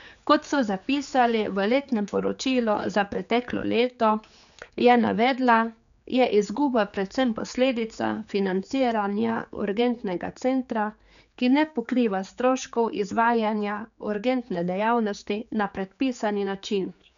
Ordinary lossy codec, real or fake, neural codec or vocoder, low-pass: none; fake; codec, 16 kHz, 4 kbps, X-Codec, HuBERT features, trained on general audio; 7.2 kHz